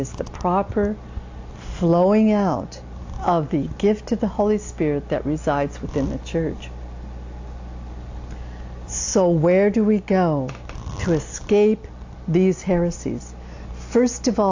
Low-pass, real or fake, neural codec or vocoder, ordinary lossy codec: 7.2 kHz; real; none; AAC, 48 kbps